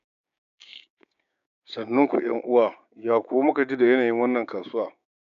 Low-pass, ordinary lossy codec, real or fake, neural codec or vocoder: 7.2 kHz; none; fake; codec, 16 kHz, 6 kbps, DAC